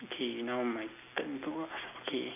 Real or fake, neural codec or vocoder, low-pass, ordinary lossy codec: real; none; 3.6 kHz; AAC, 32 kbps